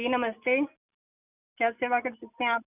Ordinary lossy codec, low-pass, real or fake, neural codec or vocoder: none; 3.6 kHz; real; none